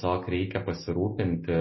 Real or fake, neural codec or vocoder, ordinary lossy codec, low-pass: real; none; MP3, 24 kbps; 7.2 kHz